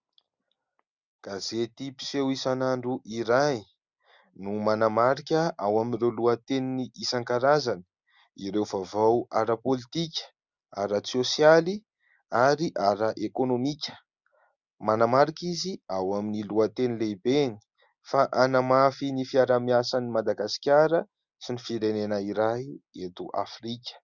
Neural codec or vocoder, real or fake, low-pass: none; real; 7.2 kHz